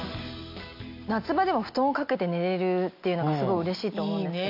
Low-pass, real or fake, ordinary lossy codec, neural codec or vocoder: 5.4 kHz; real; MP3, 48 kbps; none